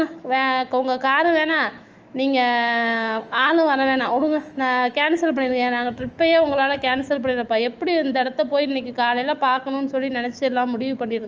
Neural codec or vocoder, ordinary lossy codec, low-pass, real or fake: autoencoder, 48 kHz, 128 numbers a frame, DAC-VAE, trained on Japanese speech; Opus, 24 kbps; 7.2 kHz; fake